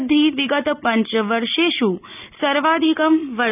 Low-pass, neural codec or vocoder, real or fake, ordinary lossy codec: 3.6 kHz; none; real; none